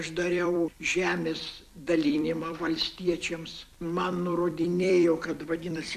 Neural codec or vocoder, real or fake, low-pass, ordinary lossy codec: vocoder, 44.1 kHz, 128 mel bands every 256 samples, BigVGAN v2; fake; 14.4 kHz; AAC, 96 kbps